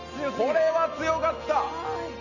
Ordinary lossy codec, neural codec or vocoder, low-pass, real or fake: none; none; 7.2 kHz; real